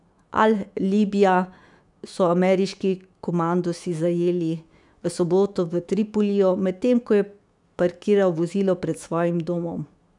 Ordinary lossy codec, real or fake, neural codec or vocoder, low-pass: none; fake; autoencoder, 48 kHz, 128 numbers a frame, DAC-VAE, trained on Japanese speech; 10.8 kHz